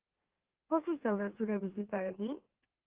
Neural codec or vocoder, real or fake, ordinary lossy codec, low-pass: autoencoder, 44.1 kHz, a latent of 192 numbers a frame, MeloTTS; fake; Opus, 16 kbps; 3.6 kHz